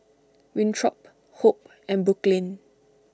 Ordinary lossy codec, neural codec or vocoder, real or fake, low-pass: none; none; real; none